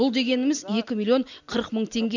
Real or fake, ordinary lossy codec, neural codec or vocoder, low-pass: real; none; none; 7.2 kHz